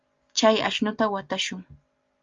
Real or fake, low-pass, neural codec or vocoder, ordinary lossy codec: real; 7.2 kHz; none; Opus, 32 kbps